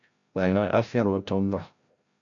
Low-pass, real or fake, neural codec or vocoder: 7.2 kHz; fake; codec, 16 kHz, 0.5 kbps, FreqCodec, larger model